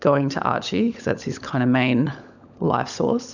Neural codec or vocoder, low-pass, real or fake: codec, 16 kHz, 16 kbps, FunCodec, trained on LibriTTS, 50 frames a second; 7.2 kHz; fake